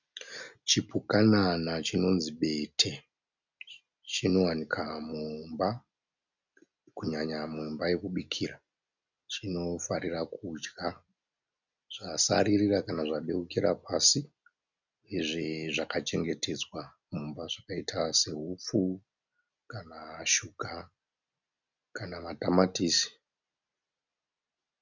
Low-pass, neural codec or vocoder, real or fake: 7.2 kHz; none; real